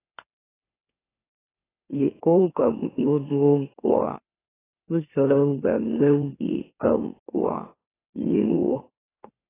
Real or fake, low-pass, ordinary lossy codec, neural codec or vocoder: fake; 3.6 kHz; AAC, 16 kbps; autoencoder, 44.1 kHz, a latent of 192 numbers a frame, MeloTTS